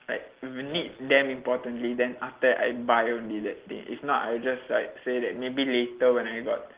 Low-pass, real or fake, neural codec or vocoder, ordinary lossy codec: 3.6 kHz; real; none; Opus, 16 kbps